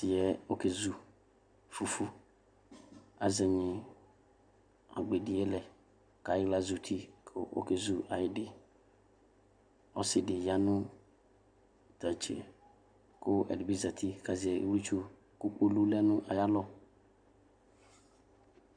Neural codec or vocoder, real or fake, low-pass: none; real; 9.9 kHz